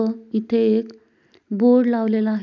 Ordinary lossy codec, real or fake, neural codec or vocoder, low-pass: none; real; none; 7.2 kHz